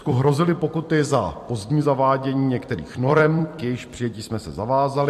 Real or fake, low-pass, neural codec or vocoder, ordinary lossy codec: fake; 14.4 kHz; vocoder, 44.1 kHz, 128 mel bands every 256 samples, BigVGAN v2; MP3, 64 kbps